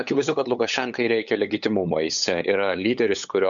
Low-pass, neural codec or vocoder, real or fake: 7.2 kHz; codec, 16 kHz, 8 kbps, FunCodec, trained on LibriTTS, 25 frames a second; fake